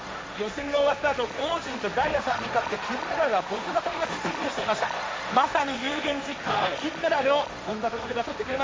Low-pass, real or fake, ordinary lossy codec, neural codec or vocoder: none; fake; none; codec, 16 kHz, 1.1 kbps, Voila-Tokenizer